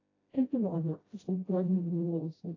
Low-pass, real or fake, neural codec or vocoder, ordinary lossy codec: 7.2 kHz; fake; codec, 16 kHz, 0.5 kbps, FreqCodec, smaller model; AAC, 32 kbps